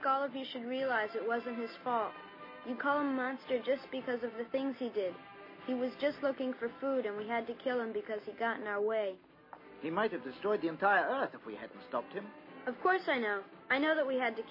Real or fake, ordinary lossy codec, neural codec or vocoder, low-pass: real; MP3, 24 kbps; none; 5.4 kHz